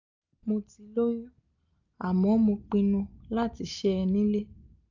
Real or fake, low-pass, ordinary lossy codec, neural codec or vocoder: real; 7.2 kHz; none; none